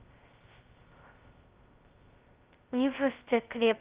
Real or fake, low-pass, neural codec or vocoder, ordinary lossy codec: fake; 3.6 kHz; codec, 16 kHz, 0.2 kbps, FocalCodec; Opus, 32 kbps